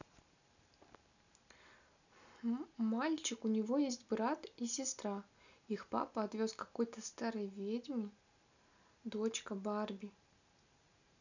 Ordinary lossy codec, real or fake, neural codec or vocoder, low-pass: none; real; none; 7.2 kHz